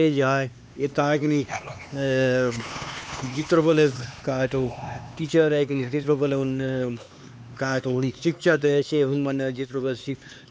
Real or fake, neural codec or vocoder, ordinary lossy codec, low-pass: fake; codec, 16 kHz, 2 kbps, X-Codec, HuBERT features, trained on LibriSpeech; none; none